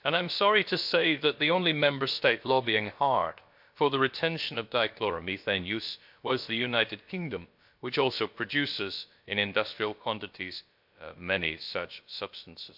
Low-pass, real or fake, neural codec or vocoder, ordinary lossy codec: 5.4 kHz; fake; codec, 16 kHz, about 1 kbps, DyCAST, with the encoder's durations; MP3, 48 kbps